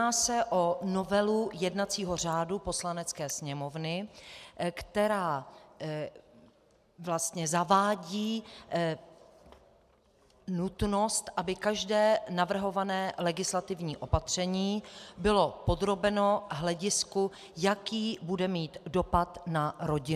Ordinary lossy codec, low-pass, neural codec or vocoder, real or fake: AAC, 96 kbps; 14.4 kHz; none; real